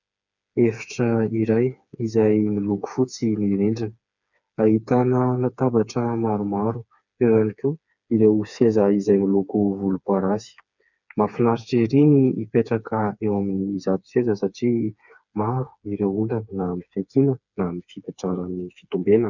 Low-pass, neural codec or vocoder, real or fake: 7.2 kHz; codec, 16 kHz, 4 kbps, FreqCodec, smaller model; fake